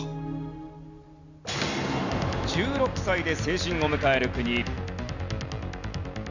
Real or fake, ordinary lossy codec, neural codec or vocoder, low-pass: real; none; none; 7.2 kHz